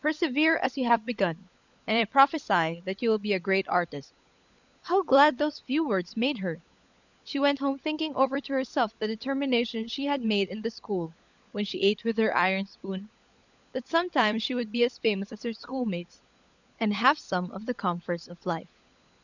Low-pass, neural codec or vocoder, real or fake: 7.2 kHz; codec, 16 kHz, 16 kbps, FunCodec, trained on LibriTTS, 50 frames a second; fake